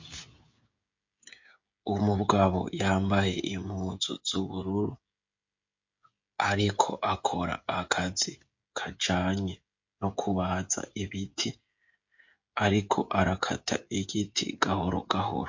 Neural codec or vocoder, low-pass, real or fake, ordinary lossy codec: codec, 16 kHz, 16 kbps, FreqCodec, smaller model; 7.2 kHz; fake; MP3, 48 kbps